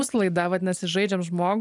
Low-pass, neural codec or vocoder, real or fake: 10.8 kHz; none; real